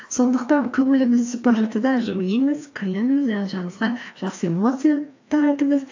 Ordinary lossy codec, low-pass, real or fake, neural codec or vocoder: AAC, 48 kbps; 7.2 kHz; fake; codec, 16 kHz, 1 kbps, FreqCodec, larger model